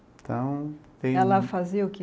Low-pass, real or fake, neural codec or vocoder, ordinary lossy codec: none; real; none; none